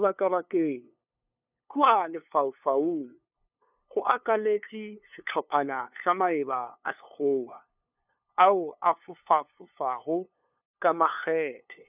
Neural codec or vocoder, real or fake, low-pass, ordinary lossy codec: codec, 16 kHz, 2 kbps, FunCodec, trained on LibriTTS, 25 frames a second; fake; 3.6 kHz; none